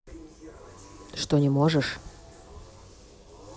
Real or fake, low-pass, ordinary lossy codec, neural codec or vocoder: real; none; none; none